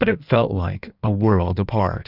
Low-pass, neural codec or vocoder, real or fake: 5.4 kHz; codec, 16 kHz in and 24 kHz out, 1.1 kbps, FireRedTTS-2 codec; fake